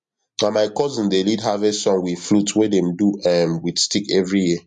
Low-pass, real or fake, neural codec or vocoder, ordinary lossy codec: 10.8 kHz; real; none; MP3, 48 kbps